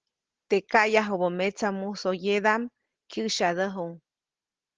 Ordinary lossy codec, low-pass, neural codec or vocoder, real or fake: Opus, 16 kbps; 7.2 kHz; none; real